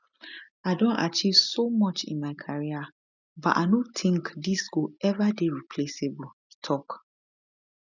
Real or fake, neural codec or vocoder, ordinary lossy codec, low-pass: real; none; none; 7.2 kHz